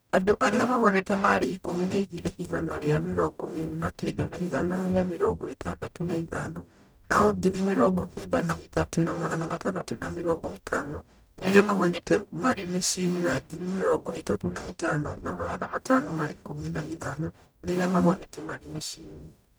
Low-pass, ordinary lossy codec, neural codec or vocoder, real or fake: none; none; codec, 44.1 kHz, 0.9 kbps, DAC; fake